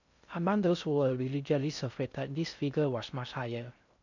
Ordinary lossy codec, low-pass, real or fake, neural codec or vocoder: none; 7.2 kHz; fake; codec, 16 kHz in and 24 kHz out, 0.6 kbps, FocalCodec, streaming, 2048 codes